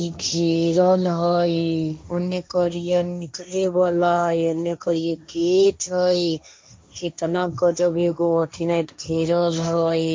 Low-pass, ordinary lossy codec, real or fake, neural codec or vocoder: none; none; fake; codec, 16 kHz, 1.1 kbps, Voila-Tokenizer